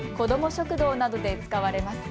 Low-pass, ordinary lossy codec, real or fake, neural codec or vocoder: none; none; real; none